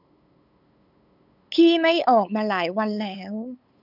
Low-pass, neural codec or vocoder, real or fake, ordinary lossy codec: 5.4 kHz; codec, 16 kHz, 8 kbps, FunCodec, trained on LibriTTS, 25 frames a second; fake; none